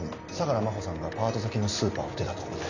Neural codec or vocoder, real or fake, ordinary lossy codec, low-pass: none; real; none; 7.2 kHz